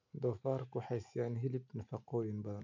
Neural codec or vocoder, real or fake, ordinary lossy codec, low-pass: none; real; none; 7.2 kHz